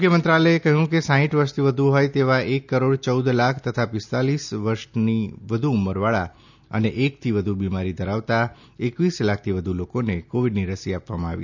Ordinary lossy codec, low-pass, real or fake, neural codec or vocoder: none; 7.2 kHz; real; none